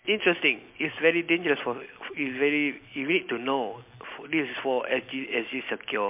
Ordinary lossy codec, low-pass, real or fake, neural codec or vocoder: MP3, 32 kbps; 3.6 kHz; real; none